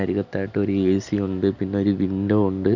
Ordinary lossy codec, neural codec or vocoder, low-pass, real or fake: none; none; 7.2 kHz; real